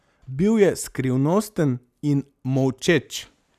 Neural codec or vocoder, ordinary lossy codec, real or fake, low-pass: none; none; real; 14.4 kHz